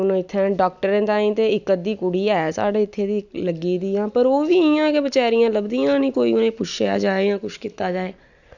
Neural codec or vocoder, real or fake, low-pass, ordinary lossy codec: none; real; 7.2 kHz; none